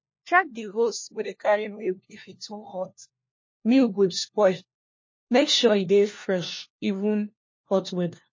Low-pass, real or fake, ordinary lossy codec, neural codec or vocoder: 7.2 kHz; fake; MP3, 32 kbps; codec, 16 kHz, 1 kbps, FunCodec, trained on LibriTTS, 50 frames a second